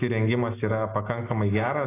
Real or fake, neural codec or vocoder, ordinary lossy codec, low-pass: real; none; AAC, 16 kbps; 3.6 kHz